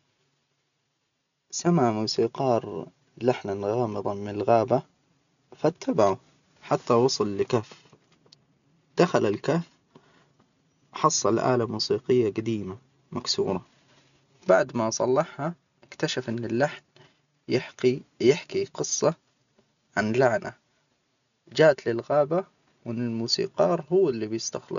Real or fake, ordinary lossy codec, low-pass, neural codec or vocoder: real; none; 7.2 kHz; none